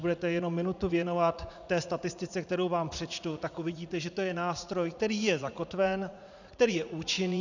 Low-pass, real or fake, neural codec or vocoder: 7.2 kHz; fake; vocoder, 44.1 kHz, 80 mel bands, Vocos